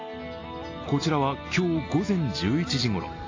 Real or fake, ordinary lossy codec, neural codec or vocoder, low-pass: real; AAC, 32 kbps; none; 7.2 kHz